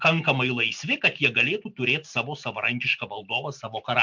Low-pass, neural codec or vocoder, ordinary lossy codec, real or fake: 7.2 kHz; none; MP3, 64 kbps; real